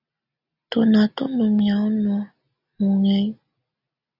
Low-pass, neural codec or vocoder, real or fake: 5.4 kHz; none; real